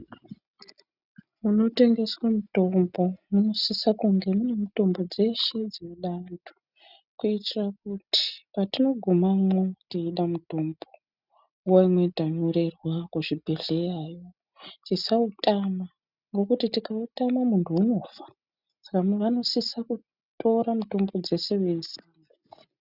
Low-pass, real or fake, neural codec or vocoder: 5.4 kHz; real; none